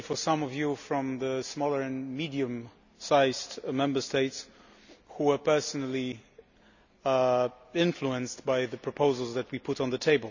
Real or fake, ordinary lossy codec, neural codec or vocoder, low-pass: real; none; none; 7.2 kHz